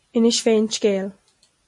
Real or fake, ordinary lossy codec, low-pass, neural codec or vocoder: real; MP3, 48 kbps; 10.8 kHz; none